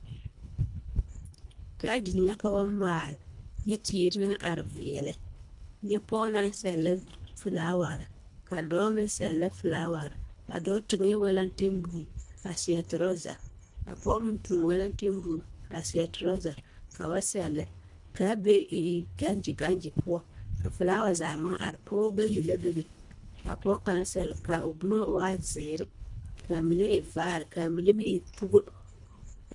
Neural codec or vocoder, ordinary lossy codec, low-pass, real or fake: codec, 24 kHz, 1.5 kbps, HILCodec; MP3, 64 kbps; 10.8 kHz; fake